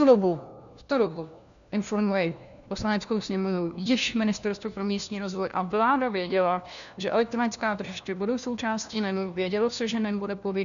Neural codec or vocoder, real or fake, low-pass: codec, 16 kHz, 1 kbps, FunCodec, trained on LibriTTS, 50 frames a second; fake; 7.2 kHz